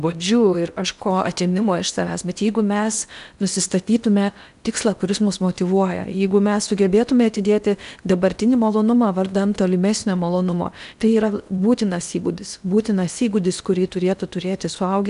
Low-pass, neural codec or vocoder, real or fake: 10.8 kHz; codec, 16 kHz in and 24 kHz out, 0.8 kbps, FocalCodec, streaming, 65536 codes; fake